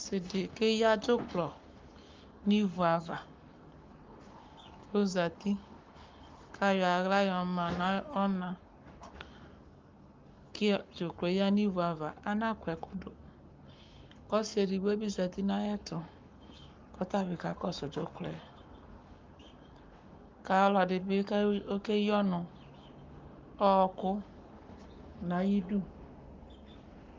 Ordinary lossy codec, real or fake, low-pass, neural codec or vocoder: Opus, 24 kbps; fake; 7.2 kHz; codec, 44.1 kHz, 7.8 kbps, Pupu-Codec